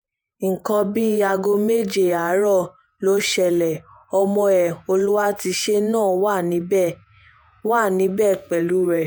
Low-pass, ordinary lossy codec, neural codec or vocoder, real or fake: none; none; vocoder, 48 kHz, 128 mel bands, Vocos; fake